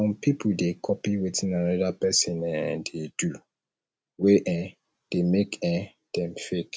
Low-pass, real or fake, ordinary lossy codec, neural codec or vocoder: none; real; none; none